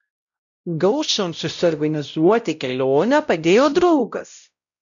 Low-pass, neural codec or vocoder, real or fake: 7.2 kHz; codec, 16 kHz, 0.5 kbps, X-Codec, WavLM features, trained on Multilingual LibriSpeech; fake